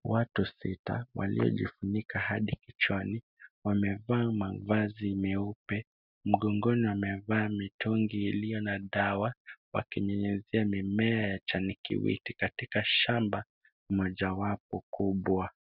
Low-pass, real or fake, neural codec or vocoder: 5.4 kHz; real; none